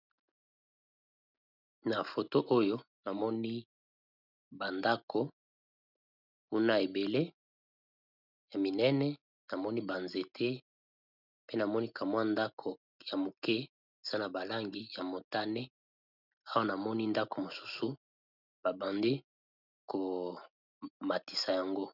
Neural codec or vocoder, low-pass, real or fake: none; 5.4 kHz; real